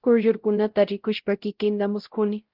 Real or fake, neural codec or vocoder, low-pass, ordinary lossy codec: fake; codec, 16 kHz, 0.5 kbps, X-Codec, WavLM features, trained on Multilingual LibriSpeech; 5.4 kHz; Opus, 16 kbps